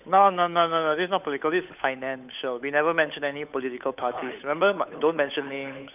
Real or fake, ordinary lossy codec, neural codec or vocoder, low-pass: fake; none; codec, 16 kHz, 8 kbps, FunCodec, trained on Chinese and English, 25 frames a second; 3.6 kHz